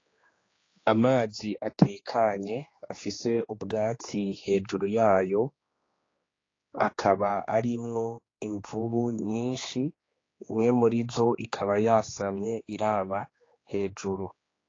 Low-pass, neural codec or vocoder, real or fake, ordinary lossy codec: 7.2 kHz; codec, 16 kHz, 2 kbps, X-Codec, HuBERT features, trained on general audio; fake; AAC, 32 kbps